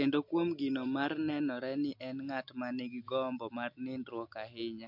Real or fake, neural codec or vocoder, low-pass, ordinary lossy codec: real; none; 5.4 kHz; MP3, 48 kbps